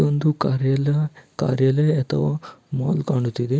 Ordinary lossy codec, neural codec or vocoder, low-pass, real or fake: none; none; none; real